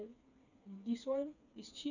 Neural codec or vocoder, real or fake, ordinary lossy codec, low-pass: codec, 16 kHz, 4 kbps, FreqCodec, smaller model; fake; none; 7.2 kHz